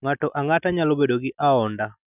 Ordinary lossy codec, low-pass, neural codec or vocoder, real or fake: none; 3.6 kHz; none; real